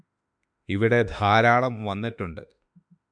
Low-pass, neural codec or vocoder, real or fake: 9.9 kHz; autoencoder, 48 kHz, 32 numbers a frame, DAC-VAE, trained on Japanese speech; fake